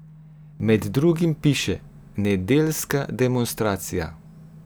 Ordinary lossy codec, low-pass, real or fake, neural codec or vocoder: none; none; real; none